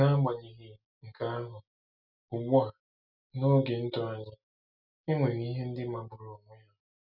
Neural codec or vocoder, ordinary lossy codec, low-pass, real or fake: none; none; 5.4 kHz; real